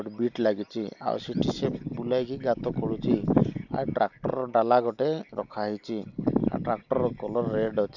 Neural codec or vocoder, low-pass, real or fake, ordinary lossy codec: none; 7.2 kHz; real; none